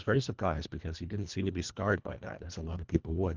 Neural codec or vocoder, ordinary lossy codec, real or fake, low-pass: codec, 24 kHz, 1.5 kbps, HILCodec; Opus, 32 kbps; fake; 7.2 kHz